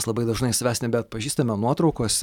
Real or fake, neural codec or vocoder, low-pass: real; none; 19.8 kHz